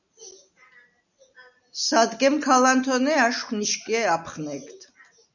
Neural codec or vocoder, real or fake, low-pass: none; real; 7.2 kHz